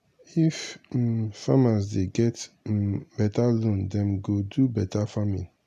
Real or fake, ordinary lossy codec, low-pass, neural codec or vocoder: real; none; 14.4 kHz; none